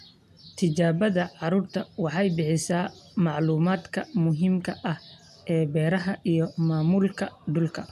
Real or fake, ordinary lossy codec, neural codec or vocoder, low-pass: real; none; none; 14.4 kHz